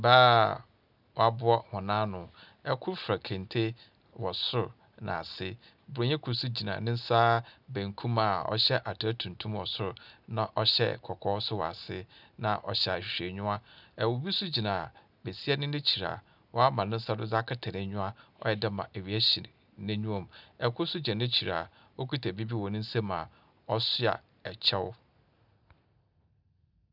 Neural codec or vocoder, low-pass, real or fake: none; 5.4 kHz; real